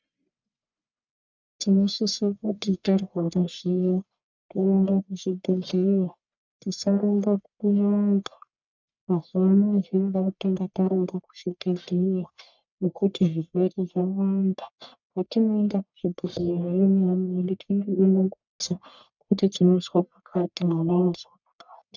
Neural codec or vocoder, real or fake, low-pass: codec, 44.1 kHz, 1.7 kbps, Pupu-Codec; fake; 7.2 kHz